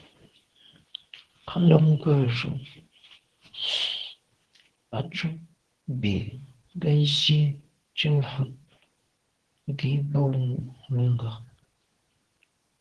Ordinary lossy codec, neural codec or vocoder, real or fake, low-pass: Opus, 16 kbps; codec, 24 kHz, 0.9 kbps, WavTokenizer, medium speech release version 2; fake; 10.8 kHz